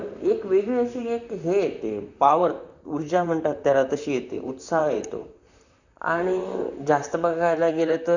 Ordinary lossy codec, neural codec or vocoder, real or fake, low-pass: none; vocoder, 44.1 kHz, 128 mel bands, Pupu-Vocoder; fake; 7.2 kHz